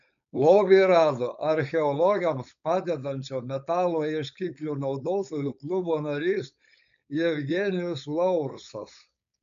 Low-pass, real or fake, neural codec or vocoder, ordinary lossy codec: 7.2 kHz; fake; codec, 16 kHz, 4.8 kbps, FACodec; AAC, 96 kbps